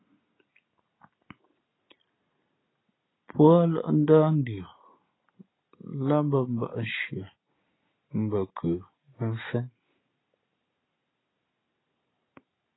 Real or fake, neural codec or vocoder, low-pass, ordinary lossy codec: real; none; 7.2 kHz; AAC, 16 kbps